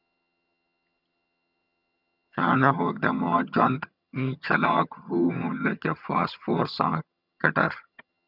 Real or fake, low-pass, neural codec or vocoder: fake; 5.4 kHz; vocoder, 22.05 kHz, 80 mel bands, HiFi-GAN